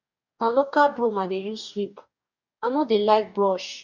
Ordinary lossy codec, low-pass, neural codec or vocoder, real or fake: none; 7.2 kHz; codec, 44.1 kHz, 2.6 kbps, DAC; fake